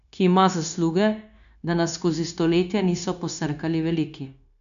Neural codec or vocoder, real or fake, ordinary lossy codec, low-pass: codec, 16 kHz, 0.9 kbps, LongCat-Audio-Codec; fake; none; 7.2 kHz